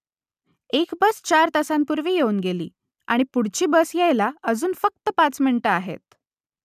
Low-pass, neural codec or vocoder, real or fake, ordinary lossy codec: 14.4 kHz; none; real; none